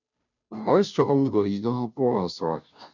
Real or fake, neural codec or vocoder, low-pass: fake; codec, 16 kHz, 0.5 kbps, FunCodec, trained on Chinese and English, 25 frames a second; 7.2 kHz